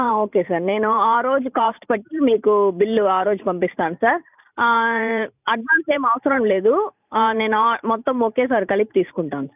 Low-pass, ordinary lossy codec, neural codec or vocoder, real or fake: 3.6 kHz; none; vocoder, 44.1 kHz, 128 mel bands every 512 samples, BigVGAN v2; fake